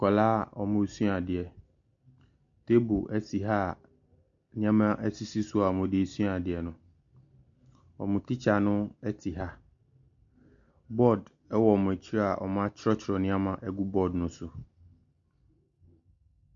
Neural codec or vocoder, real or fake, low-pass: none; real; 7.2 kHz